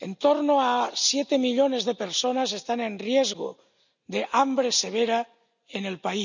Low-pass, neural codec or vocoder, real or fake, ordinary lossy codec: 7.2 kHz; none; real; none